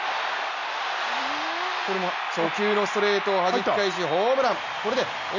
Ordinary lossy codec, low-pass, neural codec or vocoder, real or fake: none; 7.2 kHz; none; real